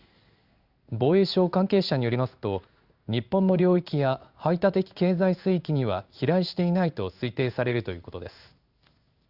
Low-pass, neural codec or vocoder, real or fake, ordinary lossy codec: 5.4 kHz; codec, 16 kHz in and 24 kHz out, 1 kbps, XY-Tokenizer; fake; Opus, 64 kbps